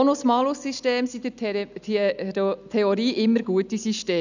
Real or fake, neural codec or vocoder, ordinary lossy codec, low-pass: real; none; none; 7.2 kHz